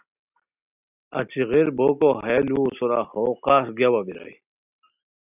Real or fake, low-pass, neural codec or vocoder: real; 3.6 kHz; none